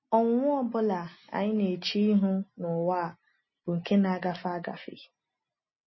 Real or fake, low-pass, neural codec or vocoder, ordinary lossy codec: real; 7.2 kHz; none; MP3, 24 kbps